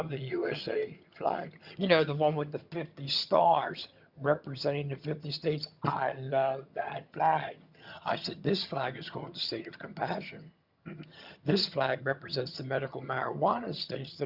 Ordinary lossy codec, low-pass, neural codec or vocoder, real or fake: Opus, 64 kbps; 5.4 kHz; vocoder, 22.05 kHz, 80 mel bands, HiFi-GAN; fake